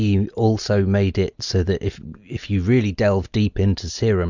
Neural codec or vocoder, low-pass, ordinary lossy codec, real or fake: none; 7.2 kHz; Opus, 64 kbps; real